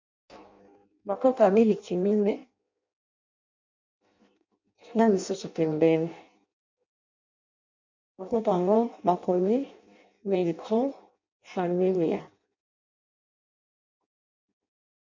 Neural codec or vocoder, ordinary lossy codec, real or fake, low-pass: codec, 16 kHz in and 24 kHz out, 0.6 kbps, FireRedTTS-2 codec; MP3, 64 kbps; fake; 7.2 kHz